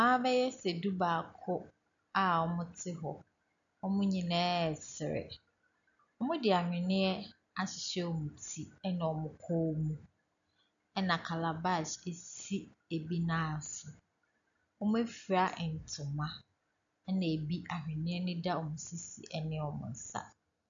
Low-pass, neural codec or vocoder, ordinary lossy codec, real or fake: 7.2 kHz; none; MP3, 96 kbps; real